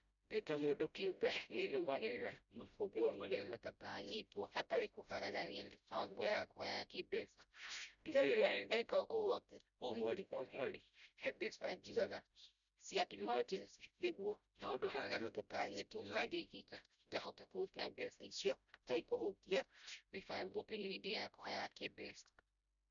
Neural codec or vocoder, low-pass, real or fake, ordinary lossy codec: codec, 16 kHz, 0.5 kbps, FreqCodec, smaller model; 7.2 kHz; fake; none